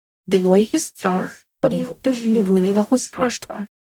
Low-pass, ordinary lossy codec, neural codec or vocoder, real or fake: 19.8 kHz; none; codec, 44.1 kHz, 0.9 kbps, DAC; fake